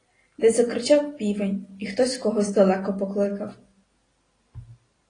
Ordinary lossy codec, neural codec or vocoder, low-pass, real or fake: AAC, 32 kbps; none; 9.9 kHz; real